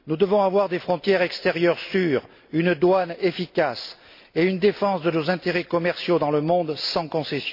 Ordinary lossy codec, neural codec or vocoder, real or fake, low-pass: MP3, 32 kbps; none; real; 5.4 kHz